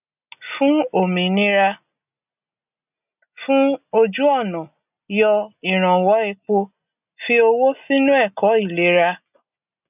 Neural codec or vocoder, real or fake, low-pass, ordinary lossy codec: none; real; 3.6 kHz; none